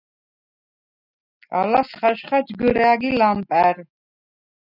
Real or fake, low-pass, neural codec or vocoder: real; 5.4 kHz; none